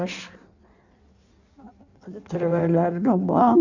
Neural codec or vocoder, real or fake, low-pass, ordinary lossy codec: codec, 16 kHz in and 24 kHz out, 1.1 kbps, FireRedTTS-2 codec; fake; 7.2 kHz; none